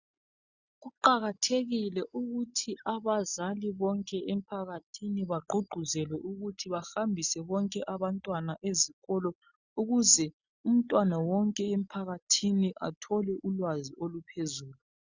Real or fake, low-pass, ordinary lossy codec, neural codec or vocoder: real; 7.2 kHz; Opus, 64 kbps; none